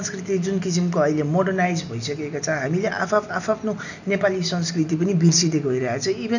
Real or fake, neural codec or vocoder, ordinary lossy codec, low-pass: real; none; none; 7.2 kHz